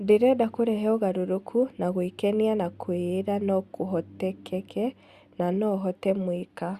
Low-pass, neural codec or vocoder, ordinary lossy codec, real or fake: 14.4 kHz; none; Opus, 64 kbps; real